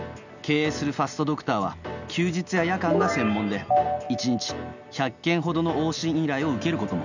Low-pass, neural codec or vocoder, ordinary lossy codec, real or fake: 7.2 kHz; none; none; real